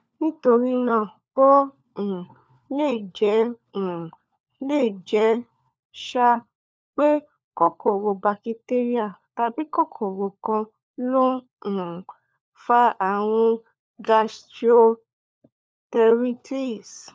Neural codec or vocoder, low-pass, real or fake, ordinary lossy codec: codec, 16 kHz, 4 kbps, FunCodec, trained on LibriTTS, 50 frames a second; none; fake; none